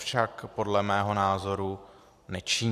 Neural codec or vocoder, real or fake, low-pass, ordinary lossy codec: none; real; 14.4 kHz; AAC, 64 kbps